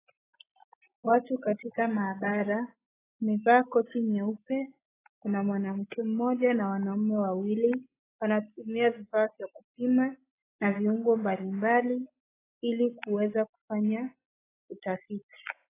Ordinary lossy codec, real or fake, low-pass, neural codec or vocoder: AAC, 16 kbps; real; 3.6 kHz; none